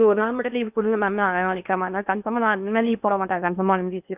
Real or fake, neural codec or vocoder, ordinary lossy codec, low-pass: fake; codec, 16 kHz in and 24 kHz out, 0.8 kbps, FocalCodec, streaming, 65536 codes; none; 3.6 kHz